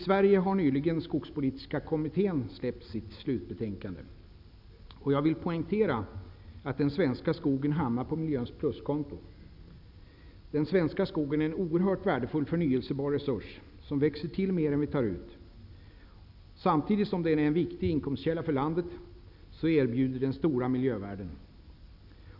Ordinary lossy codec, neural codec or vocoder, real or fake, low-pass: none; none; real; 5.4 kHz